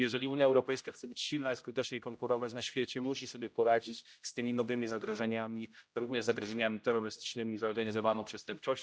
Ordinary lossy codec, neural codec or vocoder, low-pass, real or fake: none; codec, 16 kHz, 0.5 kbps, X-Codec, HuBERT features, trained on general audio; none; fake